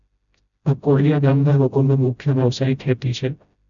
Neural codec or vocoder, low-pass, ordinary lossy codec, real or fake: codec, 16 kHz, 0.5 kbps, FreqCodec, smaller model; 7.2 kHz; none; fake